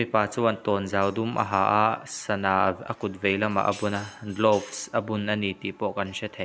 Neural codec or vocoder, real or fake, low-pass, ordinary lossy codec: none; real; none; none